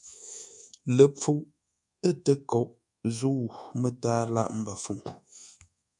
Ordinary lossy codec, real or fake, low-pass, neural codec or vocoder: MP3, 96 kbps; fake; 10.8 kHz; codec, 24 kHz, 1.2 kbps, DualCodec